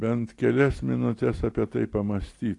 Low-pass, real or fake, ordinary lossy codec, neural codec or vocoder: 10.8 kHz; real; AAC, 48 kbps; none